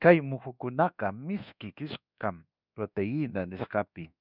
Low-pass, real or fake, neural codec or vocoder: 5.4 kHz; fake; codec, 24 kHz, 1.2 kbps, DualCodec